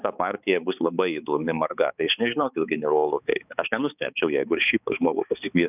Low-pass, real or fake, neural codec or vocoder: 3.6 kHz; fake; codec, 16 kHz, 4 kbps, X-Codec, HuBERT features, trained on balanced general audio